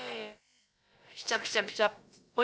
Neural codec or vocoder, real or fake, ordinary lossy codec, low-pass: codec, 16 kHz, about 1 kbps, DyCAST, with the encoder's durations; fake; none; none